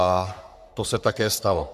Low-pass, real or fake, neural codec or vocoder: 14.4 kHz; fake; codec, 44.1 kHz, 3.4 kbps, Pupu-Codec